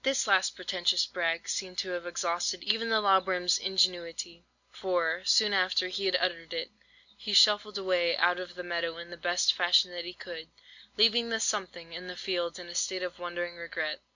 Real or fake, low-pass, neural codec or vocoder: real; 7.2 kHz; none